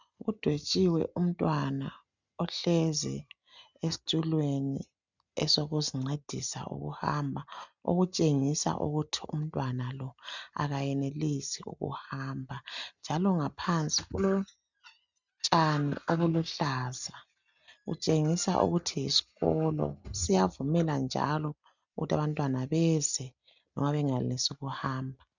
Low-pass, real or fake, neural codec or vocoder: 7.2 kHz; real; none